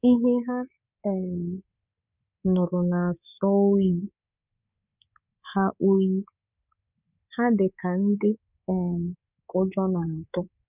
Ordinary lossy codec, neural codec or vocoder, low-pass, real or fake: none; codec, 24 kHz, 3.1 kbps, DualCodec; 3.6 kHz; fake